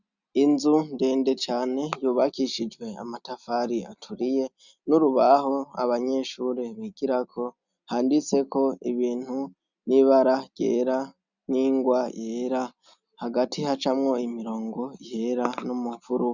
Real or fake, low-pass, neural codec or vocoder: real; 7.2 kHz; none